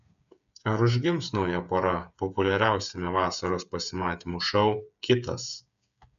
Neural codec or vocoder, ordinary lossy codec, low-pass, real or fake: codec, 16 kHz, 8 kbps, FreqCodec, smaller model; AAC, 96 kbps; 7.2 kHz; fake